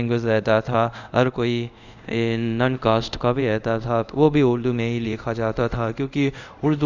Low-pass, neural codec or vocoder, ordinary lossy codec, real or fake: 7.2 kHz; codec, 24 kHz, 0.5 kbps, DualCodec; none; fake